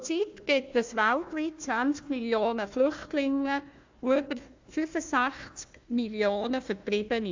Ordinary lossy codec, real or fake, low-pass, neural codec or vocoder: MP3, 64 kbps; fake; 7.2 kHz; codec, 16 kHz, 1 kbps, FunCodec, trained on Chinese and English, 50 frames a second